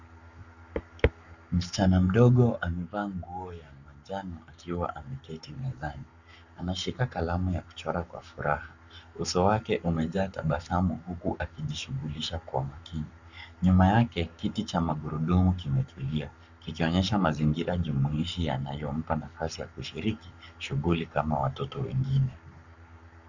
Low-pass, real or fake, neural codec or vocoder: 7.2 kHz; fake; codec, 44.1 kHz, 7.8 kbps, Pupu-Codec